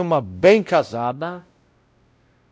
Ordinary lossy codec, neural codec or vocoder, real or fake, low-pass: none; codec, 16 kHz, 0.5 kbps, X-Codec, WavLM features, trained on Multilingual LibriSpeech; fake; none